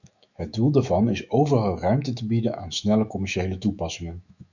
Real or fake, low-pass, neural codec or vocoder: fake; 7.2 kHz; autoencoder, 48 kHz, 128 numbers a frame, DAC-VAE, trained on Japanese speech